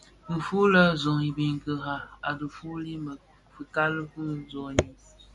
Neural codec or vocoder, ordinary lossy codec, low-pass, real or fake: none; MP3, 64 kbps; 10.8 kHz; real